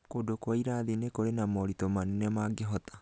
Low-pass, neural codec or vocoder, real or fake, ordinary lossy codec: none; none; real; none